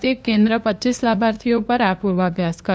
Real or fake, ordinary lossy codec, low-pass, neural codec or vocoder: fake; none; none; codec, 16 kHz, 2 kbps, FunCodec, trained on LibriTTS, 25 frames a second